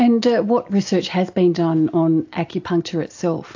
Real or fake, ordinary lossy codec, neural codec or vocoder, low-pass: real; AAC, 48 kbps; none; 7.2 kHz